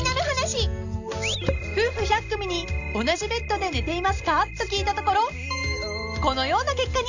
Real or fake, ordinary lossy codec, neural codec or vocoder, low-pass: real; none; none; 7.2 kHz